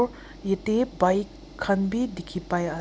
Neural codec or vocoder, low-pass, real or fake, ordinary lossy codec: none; none; real; none